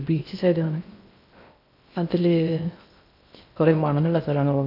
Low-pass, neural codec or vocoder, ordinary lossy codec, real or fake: 5.4 kHz; codec, 16 kHz in and 24 kHz out, 0.6 kbps, FocalCodec, streaming, 2048 codes; none; fake